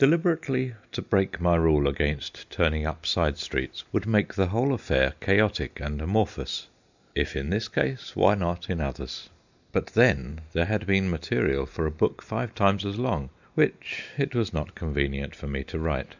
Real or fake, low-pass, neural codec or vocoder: real; 7.2 kHz; none